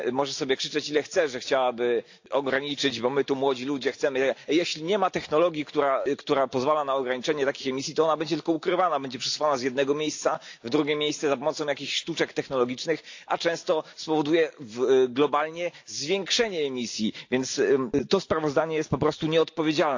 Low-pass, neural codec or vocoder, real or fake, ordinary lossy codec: 7.2 kHz; none; real; AAC, 48 kbps